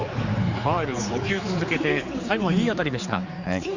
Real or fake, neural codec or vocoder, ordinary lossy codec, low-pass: fake; codec, 16 kHz, 4 kbps, X-Codec, HuBERT features, trained on general audio; Opus, 64 kbps; 7.2 kHz